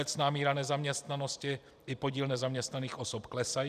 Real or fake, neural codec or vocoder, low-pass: fake; vocoder, 44.1 kHz, 128 mel bands every 256 samples, BigVGAN v2; 14.4 kHz